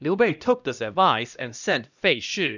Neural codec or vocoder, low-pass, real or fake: codec, 16 kHz, 1 kbps, X-Codec, HuBERT features, trained on LibriSpeech; 7.2 kHz; fake